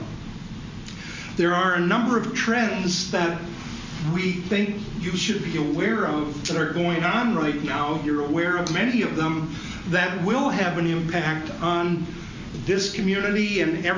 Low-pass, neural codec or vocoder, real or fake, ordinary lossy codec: 7.2 kHz; none; real; MP3, 64 kbps